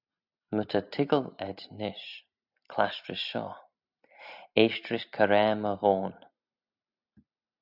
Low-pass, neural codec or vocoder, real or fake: 5.4 kHz; none; real